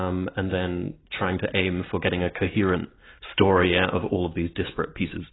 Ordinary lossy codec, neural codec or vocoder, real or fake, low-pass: AAC, 16 kbps; none; real; 7.2 kHz